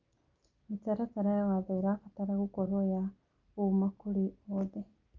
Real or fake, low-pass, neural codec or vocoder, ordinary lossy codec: real; 7.2 kHz; none; Opus, 32 kbps